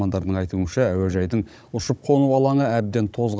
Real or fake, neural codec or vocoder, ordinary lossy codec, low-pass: fake; codec, 16 kHz, 16 kbps, FunCodec, trained on Chinese and English, 50 frames a second; none; none